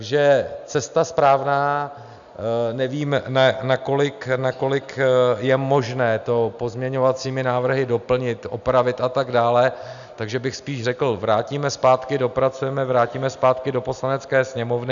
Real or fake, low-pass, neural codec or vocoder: real; 7.2 kHz; none